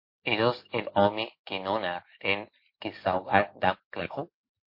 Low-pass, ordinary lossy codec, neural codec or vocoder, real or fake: 5.4 kHz; MP3, 32 kbps; none; real